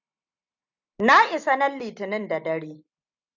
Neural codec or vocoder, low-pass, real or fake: none; 7.2 kHz; real